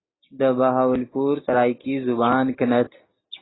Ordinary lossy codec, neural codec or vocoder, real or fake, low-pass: AAC, 16 kbps; none; real; 7.2 kHz